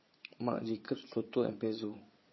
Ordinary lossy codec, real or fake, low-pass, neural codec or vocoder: MP3, 24 kbps; fake; 7.2 kHz; vocoder, 22.05 kHz, 80 mel bands, Vocos